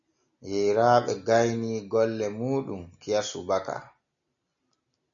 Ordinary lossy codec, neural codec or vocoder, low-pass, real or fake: MP3, 96 kbps; none; 7.2 kHz; real